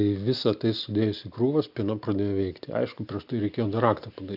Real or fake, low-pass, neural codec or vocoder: real; 5.4 kHz; none